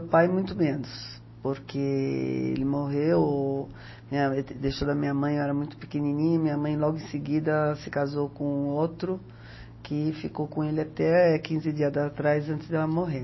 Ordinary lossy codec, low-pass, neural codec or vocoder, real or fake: MP3, 24 kbps; 7.2 kHz; none; real